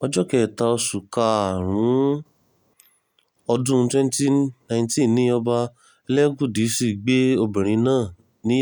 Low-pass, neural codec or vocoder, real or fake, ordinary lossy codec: none; none; real; none